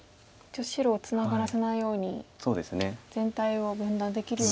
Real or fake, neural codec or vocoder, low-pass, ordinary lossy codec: real; none; none; none